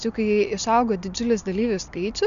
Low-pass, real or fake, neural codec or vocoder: 7.2 kHz; real; none